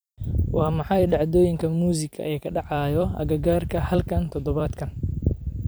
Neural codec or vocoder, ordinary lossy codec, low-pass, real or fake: vocoder, 44.1 kHz, 128 mel bands every 256 samples, BigVGAN v2; none; none; fake